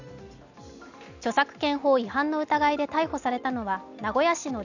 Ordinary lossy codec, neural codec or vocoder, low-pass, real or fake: none; none; 7.2 kHz; real